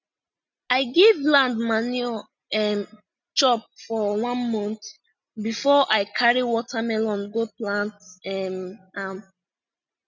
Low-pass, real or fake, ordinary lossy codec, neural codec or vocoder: 7.2 kHz; real; none; none